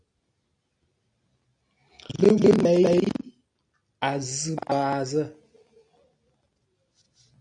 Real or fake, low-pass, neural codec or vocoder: real; 9.9 kHz; none